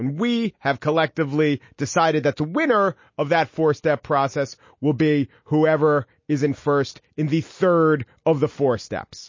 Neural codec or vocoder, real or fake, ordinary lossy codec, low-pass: none; real; MP3, 32 kbps; 7.2 kHz